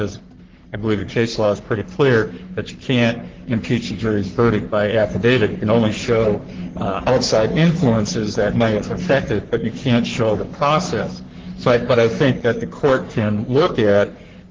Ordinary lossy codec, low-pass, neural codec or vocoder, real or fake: Opus, 16 kbps; 7.2 kHz; codec, 44.1 kHz, 3.4 kbps, Pupu-Codec; fake